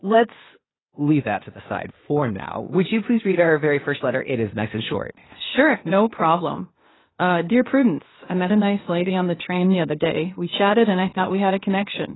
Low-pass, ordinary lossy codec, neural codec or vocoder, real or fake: 7.2 kHz; AAC, 16 kbps; codec, 16 kHz, 0.8 kbps, ZipCodec; fake